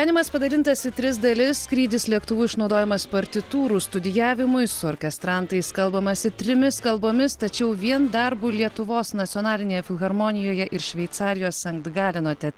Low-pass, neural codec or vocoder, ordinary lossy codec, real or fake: 19.8 kHz; none; Opus, 16 kbps; real